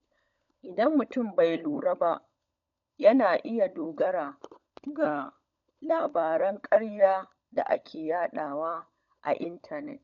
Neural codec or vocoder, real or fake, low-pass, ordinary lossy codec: codec, 16 kHz, 16 kbps, FunCodec, trained on LibriTTS, 50 frames a second; fake; 7.2 kHz; none